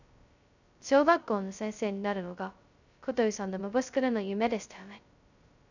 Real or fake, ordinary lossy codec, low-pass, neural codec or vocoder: fake; none; 7.2 kHz; codec, 16 kHz, 0.2 kbps, FocalCodec